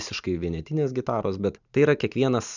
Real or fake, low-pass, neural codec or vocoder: fake; 7.2 kHz; vocoder, 44.1 kHz, 128 mel bands every 512 samples, BigVGAN v2